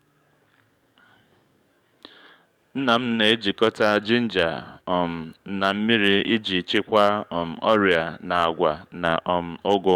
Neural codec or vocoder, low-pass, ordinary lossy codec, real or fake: codec, 44.1 kHz, 7.8 kbps, DAC; 19.8 kHz; none; fake